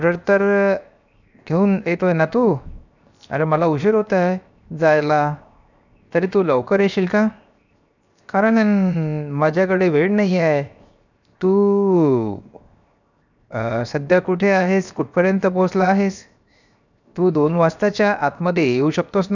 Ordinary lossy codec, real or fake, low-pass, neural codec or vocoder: none; fake; 7.2 kHz; codec, 16 kHz, 0.7 kbps, FocalCodec